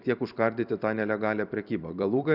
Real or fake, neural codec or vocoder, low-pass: fake; vocoder, 44.1 kHz, 128 mel bands every 256 samples, BigVGAN v2; 5.4 kHz